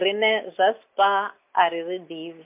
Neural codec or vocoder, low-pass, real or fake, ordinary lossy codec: none; 3.6 kHz; real; MP3, 32 kbps